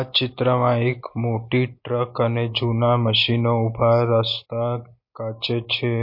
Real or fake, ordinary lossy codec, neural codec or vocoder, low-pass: real; MP3, 32 kbps; none; 5.4 kHz